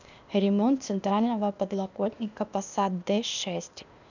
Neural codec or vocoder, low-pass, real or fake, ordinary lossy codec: codec, 16 kHz, 0.8 kbps, ZipCodec; 7.2 kHz; fake; none